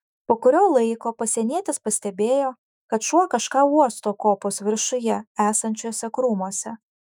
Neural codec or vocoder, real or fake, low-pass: autoencoder, 48 kHz, 128 numbers a frame, DAC-VAE, trained on Japanese speech; fake; 19.8 kHz